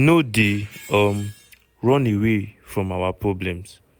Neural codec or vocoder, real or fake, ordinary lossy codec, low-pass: none; real; none; none